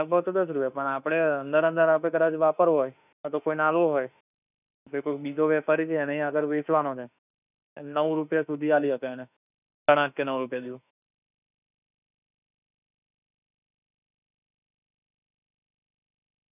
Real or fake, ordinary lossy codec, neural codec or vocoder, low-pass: fake; none; autoencoder, 48 kHz, 32 numbers a frame, DAC-VAE, trained on Japanese speech; 3.6 kHz